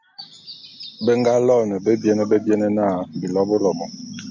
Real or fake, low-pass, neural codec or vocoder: real; 7.2 kHz; none